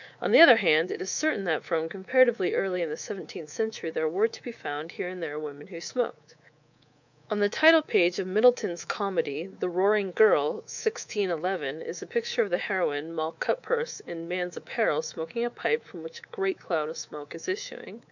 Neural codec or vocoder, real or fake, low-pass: codec, 24 kHz, 3.1 kbps, DualCodec; fake; 7.2 kHz